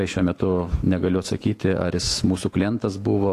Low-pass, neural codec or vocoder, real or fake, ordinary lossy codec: 14.4 kHz; autoencoder, 48 kHz, 128 numbers a frame, DAC-VAE, trained on Japanese speech; fake; AAC, 48 kbps